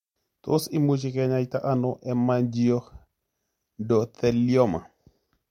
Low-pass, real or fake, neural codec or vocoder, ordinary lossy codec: 19.8 kHz; real; none; MP3, 64 kbps